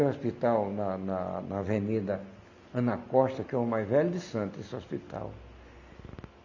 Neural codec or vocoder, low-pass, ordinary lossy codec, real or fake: none; 7.2 kHz; none; real